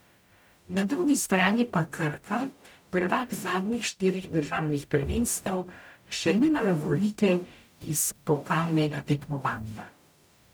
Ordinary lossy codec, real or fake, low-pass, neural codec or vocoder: none; fake; none; codec, 44.1 kHz, 0.9 kbps, DAC